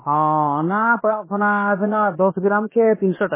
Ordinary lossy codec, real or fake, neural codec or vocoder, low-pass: MP3, 16 kbps; fake; codec, 16 kHz, about 1 kbps, DyCAST, with the encoder's durations; 3.6 kHz